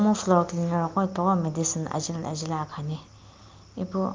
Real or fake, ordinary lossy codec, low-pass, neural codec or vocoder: real; Opus, 32 kbps; 7.2 kHz; none